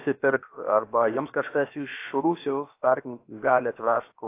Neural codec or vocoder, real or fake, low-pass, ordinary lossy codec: codec, 16 kHz, about 1 kbps, DyCAST, with the encoder's durations; fake; 3.6 kHz; AAC, 24 kbps